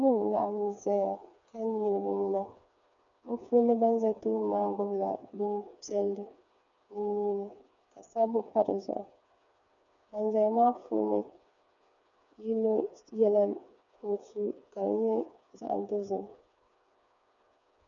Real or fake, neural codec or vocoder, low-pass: fake; codec, 16 kHz, 4 kbps, FreqCodec, smaller model; 7.2 kHz